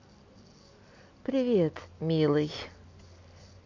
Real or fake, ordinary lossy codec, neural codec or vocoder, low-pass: real; MP3, 48 kbps; none; 7.2 kHz